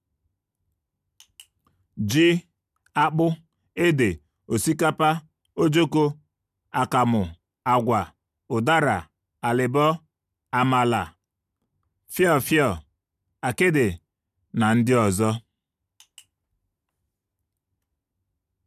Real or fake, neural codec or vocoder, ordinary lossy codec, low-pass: real; none; none; 14.4 kHz